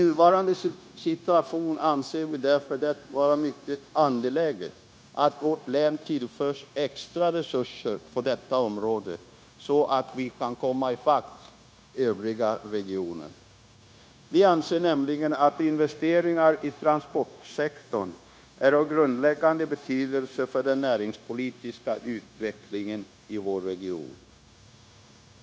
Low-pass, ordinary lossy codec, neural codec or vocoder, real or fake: none; none; codec, 16 kHz, 0.9 kbps, LongCat-Audio-Codec; fake